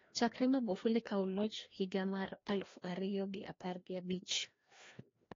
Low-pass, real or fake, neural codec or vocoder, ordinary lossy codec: 7.2 kHz; fake; codec, 16 kHz, 1 kbps, FreqCodec, larger model; AAC, 32 kbps